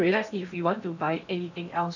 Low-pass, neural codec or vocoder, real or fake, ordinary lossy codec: 7.2 kHz; codec, 16 kHz in and 24 kHz out, 0.8 kbps, FocalCodec, streaming, 65536 codes; fake; none